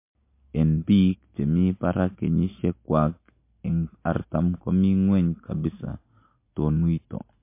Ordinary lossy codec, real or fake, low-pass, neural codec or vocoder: MP3, 24 kbps; real; 3.6 kHz; none